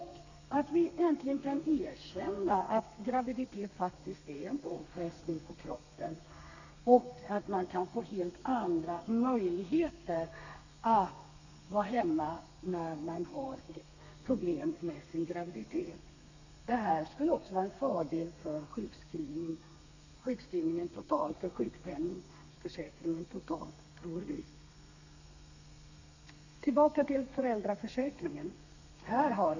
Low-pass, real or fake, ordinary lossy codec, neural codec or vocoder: 7.2 kHz; fake; none; codec, 32 kHz, 1.9 kbps, SNAC